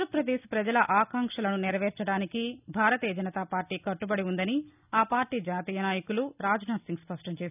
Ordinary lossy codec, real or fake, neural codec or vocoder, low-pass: none; real; none; 3.6 kHz